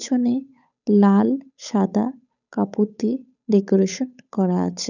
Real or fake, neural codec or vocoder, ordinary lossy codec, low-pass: fake; codec, 16 kHz, 8 kbps, FunCodec, trained on Chinese and English, 25 frames a second; none; 7.2 kHz